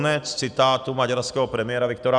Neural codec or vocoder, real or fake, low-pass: none; real; 9.9 kHz